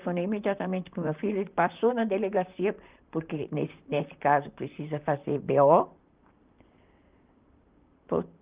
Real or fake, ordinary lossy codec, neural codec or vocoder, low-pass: fake; Opus, 32 kbps; vocoder, 44.1 kHz, 128 mel bands, Pupu-Vocoder; 3.6 kHz